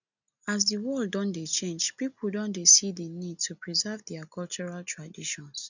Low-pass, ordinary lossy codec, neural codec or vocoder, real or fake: 7.2 kHz; none; none; real